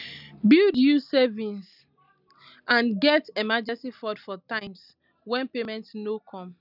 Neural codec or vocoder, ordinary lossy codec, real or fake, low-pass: none; none; real; 5.4 kHz